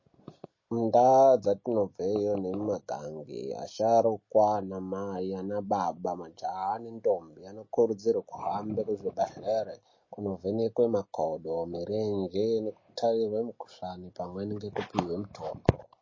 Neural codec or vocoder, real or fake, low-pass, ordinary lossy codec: none; real; 7.2 kHz; MP3, 32 kbps